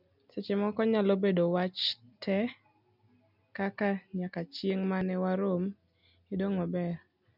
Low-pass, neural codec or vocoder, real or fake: 5.4 kHz; none; real